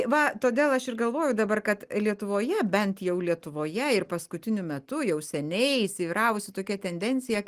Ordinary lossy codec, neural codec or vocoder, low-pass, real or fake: Opus, 24 kbps; none; 14.4 kHz; real